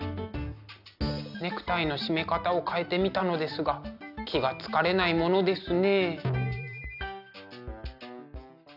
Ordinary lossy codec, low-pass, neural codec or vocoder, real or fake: none; 5.4 kHz; none; real